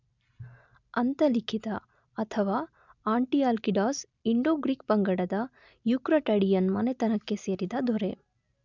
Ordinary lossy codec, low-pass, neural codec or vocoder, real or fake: none; 7.2 kHz; none; real